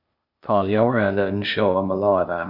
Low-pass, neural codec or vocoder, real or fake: 5.4 kHz; codec, 16 kHz, 0.8 kbps, ZipCodec; fake